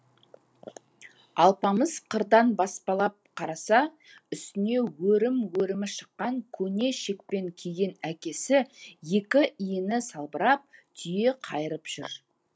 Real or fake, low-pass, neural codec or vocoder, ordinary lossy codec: real; none; none; none